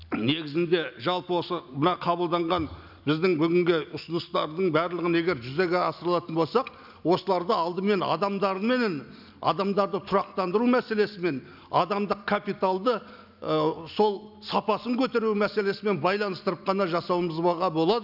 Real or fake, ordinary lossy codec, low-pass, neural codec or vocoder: real; none; 5.4 kHz; none